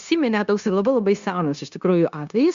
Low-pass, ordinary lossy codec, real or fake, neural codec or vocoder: 7.2 kHz; Opus, 64 kbps; fake; codec, 16 kHz, 0.9 kbps, LongCat-Audio-Codec